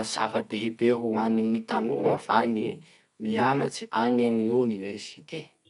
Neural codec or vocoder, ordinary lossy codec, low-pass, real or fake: codec, 24 kHz, 0.9 kbps, WavTokenizer, medium music audio release; MP3, 96 kbps; 10.8 kHz; fake